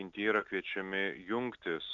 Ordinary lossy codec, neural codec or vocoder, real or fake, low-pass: MP3, 96 kbps; none; real; 7.2 kHz